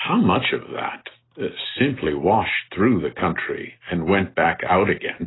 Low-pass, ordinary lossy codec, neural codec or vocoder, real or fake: 7.2 kHz; AAC, 16 kbps; none; real